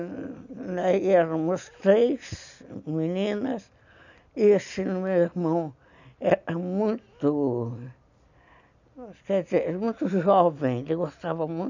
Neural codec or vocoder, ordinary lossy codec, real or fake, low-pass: none; none; real; 7.2 kHz